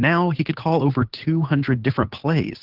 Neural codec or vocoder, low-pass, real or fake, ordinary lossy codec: codec, 16 kHz, 8 kbps, FunCodec, trained on Chinese and English, 25 frames a second; 5.4 kHz; fake; Opus, 16 kbps